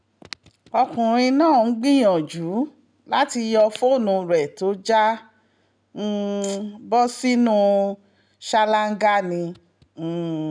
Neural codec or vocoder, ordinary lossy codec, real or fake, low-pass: none; none; real; 9.9 kHz